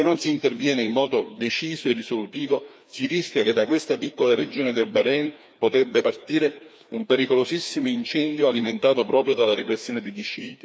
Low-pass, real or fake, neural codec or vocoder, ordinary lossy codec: none; fake; codec, 16 kHz, 2 kbps, FreqCodec, larger model; none